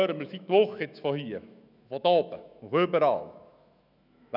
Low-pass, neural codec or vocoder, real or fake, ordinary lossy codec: 5.4 kHz; autoencoder, 48 kHz, 128 numbers a frame, DAC-VAE, trained on Japanese speech; fake; none